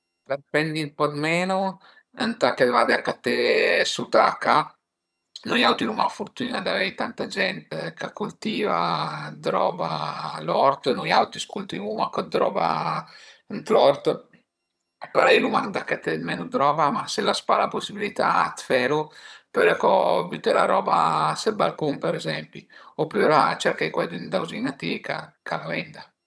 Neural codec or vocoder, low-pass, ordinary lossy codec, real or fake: vocoder, 22.05 kHz, 80 mel bands, HiFi-GAN; none; none; fake